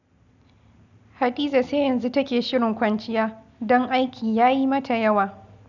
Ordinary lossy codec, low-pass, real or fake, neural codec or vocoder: none; 7.2 kHz; real; none